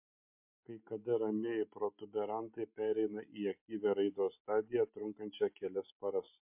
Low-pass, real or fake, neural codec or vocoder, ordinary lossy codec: 3.6 kHz; real; none; MP3, 32 kbps